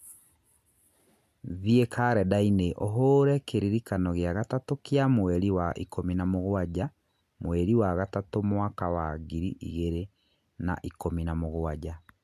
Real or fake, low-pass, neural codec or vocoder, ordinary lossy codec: real; 14.4 kHz; none; none